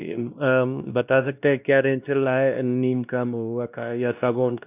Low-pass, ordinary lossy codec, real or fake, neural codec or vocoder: 3.6 kHz; none; fake; codec, 16 kHz, 1 kbps, X-Codec, WavLM features, trained on Multilingual LibriSpeech